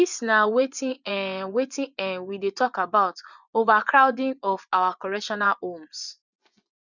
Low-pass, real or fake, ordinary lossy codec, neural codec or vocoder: 7.2 kHz; fake; none; vocoder, 24 kHz, 100 mel bands, Vocos